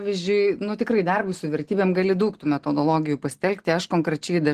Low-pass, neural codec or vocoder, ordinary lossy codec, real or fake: 14.4 kHz; none; Opus, 16 kbps; real